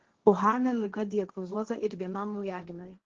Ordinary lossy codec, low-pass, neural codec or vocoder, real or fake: Opus, 16 kbps; 7.2 kHz; codec, 16 kHz, 1.1 kbps, Voila-Tokenizer; fake